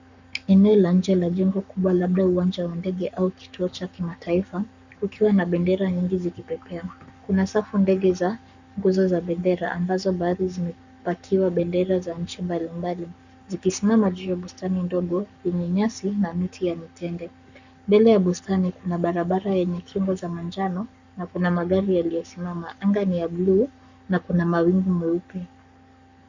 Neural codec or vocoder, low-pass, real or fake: codec, 44.1 kHz, 7.8 kbps, Pupu-Codec; 7.2 kHz; fake